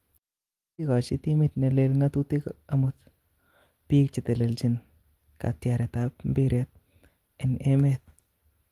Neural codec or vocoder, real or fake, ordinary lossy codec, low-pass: none; real; Opus, 24 kbps; 14.4 kHz